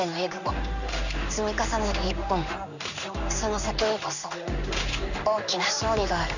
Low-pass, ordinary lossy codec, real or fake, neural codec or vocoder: 7.2 kHz; none; fake; codec, 16 kHz in and 24 kHz out, 1 kbps, XY-Tokenizer